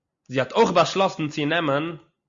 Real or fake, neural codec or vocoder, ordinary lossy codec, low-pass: real; none; Opus, 64 kbps; 7.2 kHz